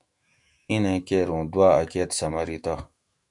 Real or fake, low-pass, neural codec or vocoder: fake; 10.8 kHz; autoencoder, 48 kHz, 128 numbers a frame, DAC-VAE, trained on Japanese speech